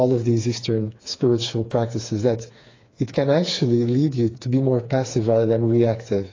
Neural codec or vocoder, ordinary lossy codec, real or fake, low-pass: codec, 16 kHz, 4 kbps, FreqCodec, smaller model; AAC, 32 kbps; fake; 7.2 kHz